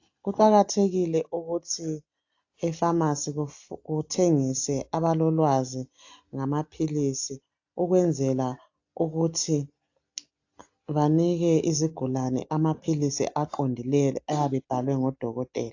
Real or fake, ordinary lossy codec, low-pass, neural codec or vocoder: real; AAC, 48 kbps; 7.2 kHz; none